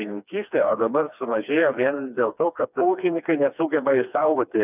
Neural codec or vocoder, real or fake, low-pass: codec, 16 kHz, 2 kbps, FreqCodec, smaller model; fake; 3.6 kHz